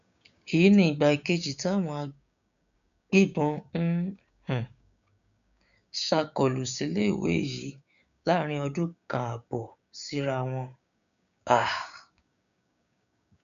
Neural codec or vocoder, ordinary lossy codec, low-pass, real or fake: codec, 16 kHz, 6 kbps, DAC; none; 7.2 kHz; fake